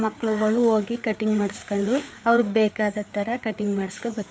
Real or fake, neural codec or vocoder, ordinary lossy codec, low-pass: fake; codec, 16 kHz, 4 kbps, FreqCodec, larger model; none; none